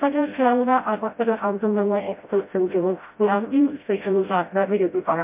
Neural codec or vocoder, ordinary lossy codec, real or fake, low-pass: codec, 16 kHz, 0.5 kbps, FreqCodec, smaller model; MP3, 32 kbps; fake; 3.6 kHz